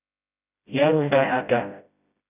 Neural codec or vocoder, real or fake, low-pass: codec, 16 kHz, 0.5 kbps, FreqCodec, smaller model; fake; 3.6 kHz